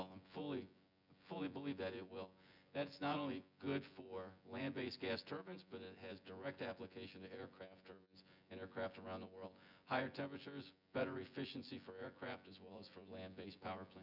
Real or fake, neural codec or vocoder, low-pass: fake; vocoder, 24 kHz, 100 mel bands, Vocos; 5.4 kHz